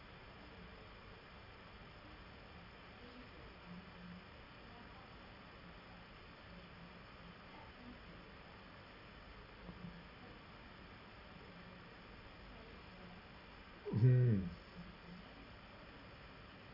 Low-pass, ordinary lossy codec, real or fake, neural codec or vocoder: 5.4 kHz; none; real; none